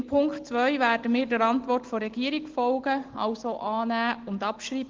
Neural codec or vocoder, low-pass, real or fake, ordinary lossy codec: none; 7.2 kHz; real; Opus, 16 kbps